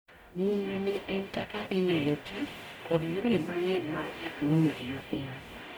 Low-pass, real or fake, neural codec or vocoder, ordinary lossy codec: none; fake; codec, 44.1 kHz, 0.9 kbps, DAC; none